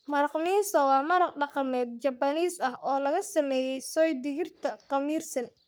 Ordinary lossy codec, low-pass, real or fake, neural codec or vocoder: none; none; fake; codec, 44.1 kHz, 3.4 kbps, Pupu-Codec